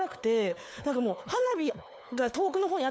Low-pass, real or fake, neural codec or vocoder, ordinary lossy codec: none; fake; codec, 16 kHz, 4 kbps, FunCodec, trained on LibriTTS, 50 frames a second; none